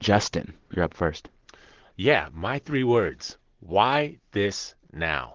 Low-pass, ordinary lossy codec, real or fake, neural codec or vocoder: 7.2 kHz; Opus, 16 kbps; real; none